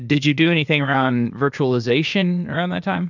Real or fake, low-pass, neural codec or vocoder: fake; 7.2 kHz; codec, 16 kHz, 0.8 kbps, ZipCodec